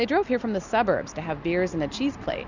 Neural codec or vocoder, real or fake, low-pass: none; real; 7.2 kHz